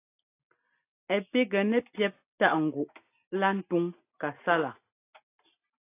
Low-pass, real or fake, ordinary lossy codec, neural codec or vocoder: 3.6 kHz; real; AAC, 24 kbps; none